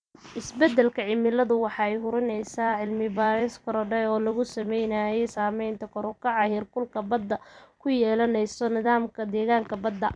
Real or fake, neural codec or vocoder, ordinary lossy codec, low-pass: fake; vocoder, 44.1 kHz, 128 mel bands every 256 samples, BigVGAN v2; none; 9.9 kHz